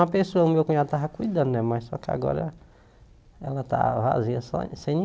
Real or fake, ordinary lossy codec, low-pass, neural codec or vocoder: real; none; none; none